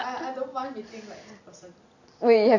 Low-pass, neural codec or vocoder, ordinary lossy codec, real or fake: 7.2 kHz; none; none; real